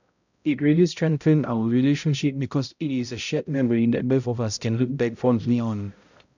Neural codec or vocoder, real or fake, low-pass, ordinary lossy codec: codec, 16 kHz, 0.5 kbps, X-Codec, HuBERT features, trained on balanced general audio; fake; 7.2 kHz; none